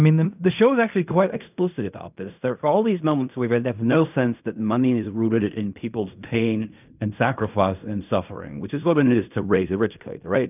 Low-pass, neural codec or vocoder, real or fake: 3.6 kHz; codec, 16 kHz in and 24 kHz out, 0.4 kbps, LongCat-Audio-Codec, fine tuned four codebook decoder; fake